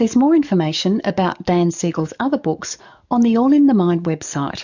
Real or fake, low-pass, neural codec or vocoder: fake; 7.2 kHz; codec, 44.1 kHz, 7.8 kbps, DAC